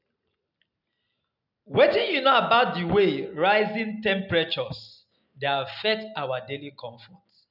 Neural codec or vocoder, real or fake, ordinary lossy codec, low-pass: none; real; none; 5.4 kHz